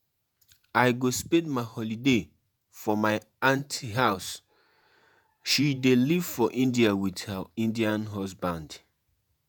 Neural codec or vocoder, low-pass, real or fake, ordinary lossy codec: vocoder, 48 kHz, 128 mel bands, Vocos; none; fake; none